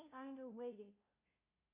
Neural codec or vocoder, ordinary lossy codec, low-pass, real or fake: codec, 16 kHz, 0.5 kbps, FunCodec, trained on LibriTTS, 25 frames a second; MP3, 32 kbps; 3.6 kHz; fake